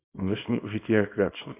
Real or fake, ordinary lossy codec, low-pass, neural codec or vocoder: fake; AAC, 24 kbps; 3.6 kHz; codec, 24 kHz, 0.9 kbps, WavTokenizer, small release